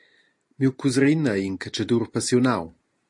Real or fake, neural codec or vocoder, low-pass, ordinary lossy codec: real; none; 10.8 kHz; MP3, 48 kbps